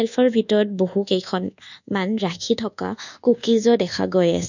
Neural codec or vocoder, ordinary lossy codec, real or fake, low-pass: codec, 24 kHz, 1.2 kbps, DualCodec; none; fake; 7.2 kHz